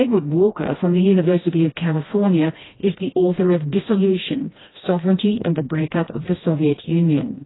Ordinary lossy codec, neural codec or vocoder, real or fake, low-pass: AAC, 16 kbps; codec, 16 kHz, 1 kbps, FreqCodec, smaller model; fake; 7.2 kHz